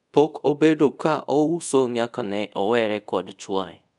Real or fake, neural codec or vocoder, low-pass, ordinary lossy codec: fake; codec, 24 kHz, 0.5 kbps, DualCodec; 10.8 kHz; none